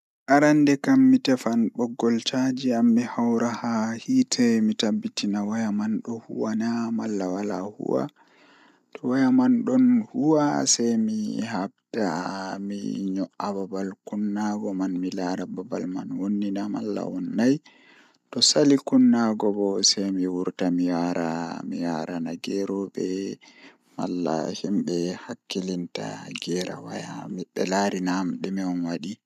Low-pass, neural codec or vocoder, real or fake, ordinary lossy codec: 14.4 kHz; none; real; none